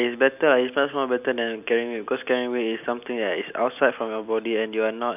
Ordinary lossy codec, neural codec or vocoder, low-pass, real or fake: none; none; 3.6 kHz; real